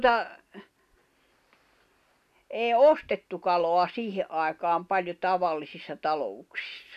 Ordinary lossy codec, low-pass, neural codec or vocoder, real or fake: none; 14.4 kHz; none; real